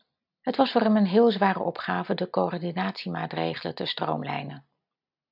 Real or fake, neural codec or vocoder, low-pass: real; none; 5.4 kHz